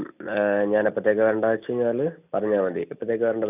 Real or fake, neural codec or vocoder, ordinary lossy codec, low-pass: real; none; none; 3.6 kHz